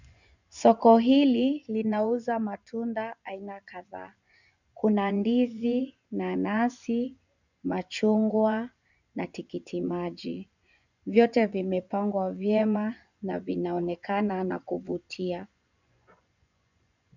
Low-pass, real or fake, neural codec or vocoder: 7.2 kHz; fake; vocoder, 24 kHz, 100 mel bands, Vocos